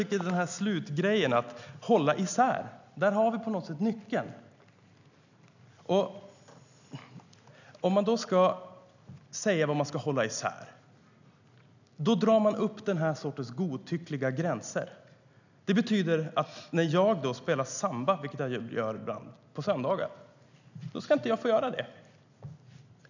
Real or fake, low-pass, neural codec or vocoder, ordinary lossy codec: real; 7.2 kHz; none; none